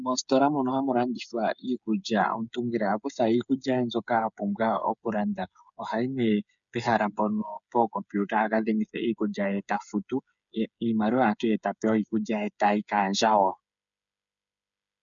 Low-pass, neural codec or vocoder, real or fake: 7.2 kHz; codec, 16 kHz, 8 kbps, FreqCodec, smaller model; fake